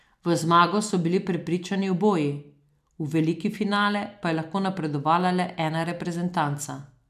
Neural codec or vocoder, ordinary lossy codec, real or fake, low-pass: none; none; real; 14.4 kHz